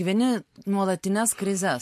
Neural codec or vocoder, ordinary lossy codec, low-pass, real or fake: none; MP3, 64 kbps; 14.4 kHz; real